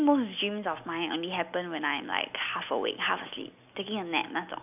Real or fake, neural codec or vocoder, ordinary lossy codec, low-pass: real; none; none; 3.6 kHz